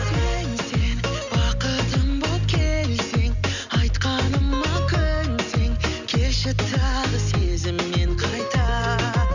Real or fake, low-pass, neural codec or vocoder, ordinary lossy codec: real; 7.2 kHz; none; none